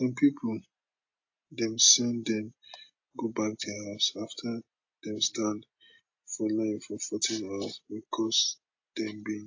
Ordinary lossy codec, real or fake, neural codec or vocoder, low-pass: AAC, 48 kbps; real; none; 7.2 kHz